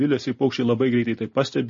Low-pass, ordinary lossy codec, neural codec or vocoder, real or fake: 7.2 kHz; MP3, 32 kbps; none; real